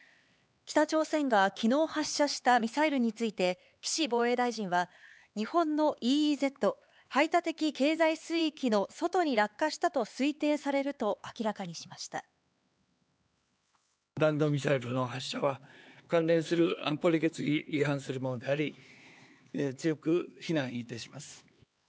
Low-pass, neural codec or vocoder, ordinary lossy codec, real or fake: none; codec, 16 kHz, 4 kbps, X-Codec, HuBERT features, trained on LibriSpeech; none; fake